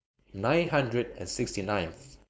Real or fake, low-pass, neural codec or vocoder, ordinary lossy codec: fake; none; codec, 16 kHz, 4.8 kbps, FACodec; none